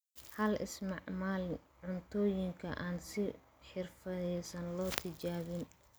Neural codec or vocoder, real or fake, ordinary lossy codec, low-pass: none; real; none; none